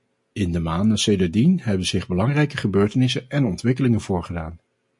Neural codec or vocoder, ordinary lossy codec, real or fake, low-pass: none; MP3, 48 kbps; real; 10.8 kHz